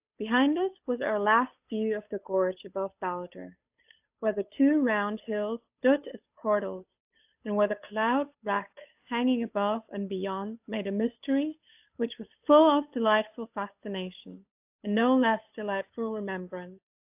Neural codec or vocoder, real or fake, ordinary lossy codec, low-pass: codec, 16 kHz, 8 kbps, FunCodec, trained on Chinese and English, 25 frames a second; fake; AAC, 32 kbps; 3.6 kHz